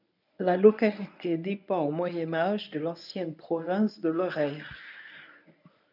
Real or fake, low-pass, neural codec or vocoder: fake; 5.4 kHz; codec, 24 kHz, 0.9 kbps, WavTokenizer, medium speech release version 1